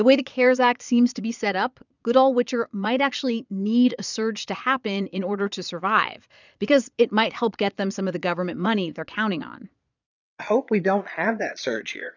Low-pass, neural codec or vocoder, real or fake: 7.2 kHz; vocoder, 22.05 kHz, 80 mel bands, Vocos; fake